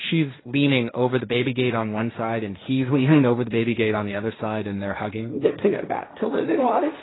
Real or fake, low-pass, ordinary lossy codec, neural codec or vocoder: fake; 7.2 kHz; AAC, 16 kbps; codec, 16 kHz, 1.1 kbps, Voila-Tokenizer